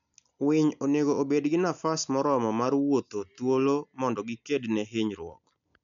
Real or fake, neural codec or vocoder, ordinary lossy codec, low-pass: real; none; none; 7.2 kHz